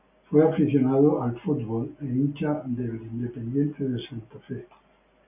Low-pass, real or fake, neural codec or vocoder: 3.6 kHz; real; none